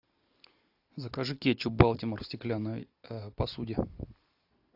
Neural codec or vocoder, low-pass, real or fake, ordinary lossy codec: none; 5.4 kHz; real; AAC, 48 kbps